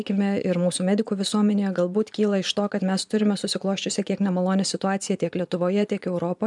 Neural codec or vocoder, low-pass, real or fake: vocoder, 24 kHz, 100 mel bands, Vocos; 10.8 kHz; fake